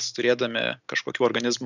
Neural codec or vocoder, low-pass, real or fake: none; 7.2 kHz; real